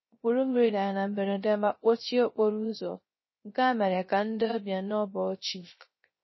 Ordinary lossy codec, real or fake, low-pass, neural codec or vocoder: MP3, 24 kbps; fake; 7.2 kHz; codec, 16 kHz, 0.3 kbps, FocalCodec